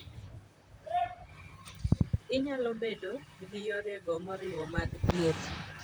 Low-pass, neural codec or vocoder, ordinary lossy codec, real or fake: none; vocoder, 44.1 kHz, 128 mel bands, Pupu-Vocoder; none; fake